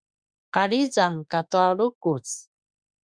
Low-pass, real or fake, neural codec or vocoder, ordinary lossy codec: 9.9 kHz; fake; autoencoder, 48 kHz, 32 numbers a frame, DAC-VAE, trained on Japanese speech; Opus, 64 kbps